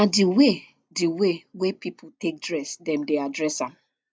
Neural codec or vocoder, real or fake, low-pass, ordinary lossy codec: none; real; none; none